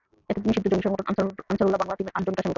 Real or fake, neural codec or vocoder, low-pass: real; none; 7.2 kHz